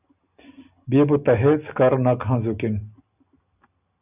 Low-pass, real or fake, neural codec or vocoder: 3.6 kHz; real; none